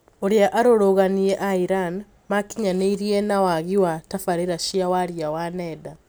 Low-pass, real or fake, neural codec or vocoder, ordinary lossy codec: none; real; none; none